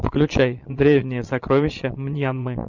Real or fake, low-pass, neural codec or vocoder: fake; 7.2 kHz; vocoder, 22.05 kHz, 80 mel bands, Vocos